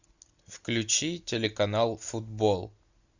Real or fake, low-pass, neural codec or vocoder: real; 7.2 kHz; none